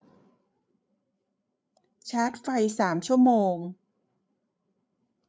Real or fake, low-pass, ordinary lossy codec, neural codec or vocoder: fake; none; none; codec, 16 kHz, 8 kbps, FreqCodec, larger model